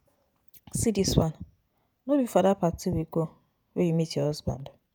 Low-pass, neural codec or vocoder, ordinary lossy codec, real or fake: none; vocoder, 48 kHz, 128 mel bands, Vocos; none; fake